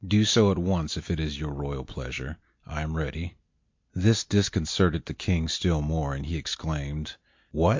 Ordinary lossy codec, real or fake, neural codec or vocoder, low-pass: MP3, 48 kbps; real; none; 7.2 kHz